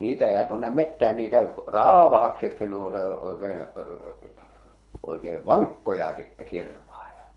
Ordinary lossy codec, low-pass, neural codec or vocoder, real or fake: none; 10.8 kHz; codec, 24 kHz, 3 kbps, HILCodec; fake